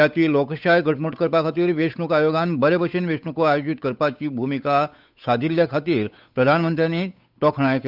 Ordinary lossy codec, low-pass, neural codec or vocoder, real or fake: none; 5.4 kHz; codec, 16 kHz, 8 kbps, FunCodec, trained on Chinese and English, 25 frames a second; fake